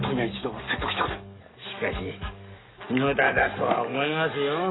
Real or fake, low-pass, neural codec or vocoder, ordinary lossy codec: fake; 7.2 kHz; codec, 44.1 kHz, 7.8 kbps, DAC; AAC, 16 kbps